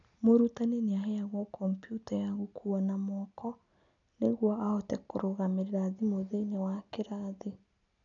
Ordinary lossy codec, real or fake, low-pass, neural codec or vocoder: none; real; 7.2 kHz; none